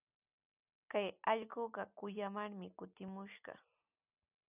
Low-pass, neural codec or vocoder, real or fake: 3.6 kHz; none; real